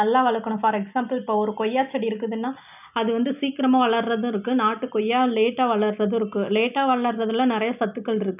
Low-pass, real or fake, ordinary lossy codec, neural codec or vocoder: 3.6 kHz; real; none; none